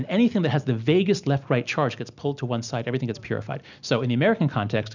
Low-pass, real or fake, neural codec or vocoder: 7.2 kHz; real; none